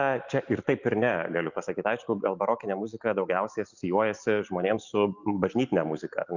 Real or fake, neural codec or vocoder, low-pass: real; none; 7.2 kHz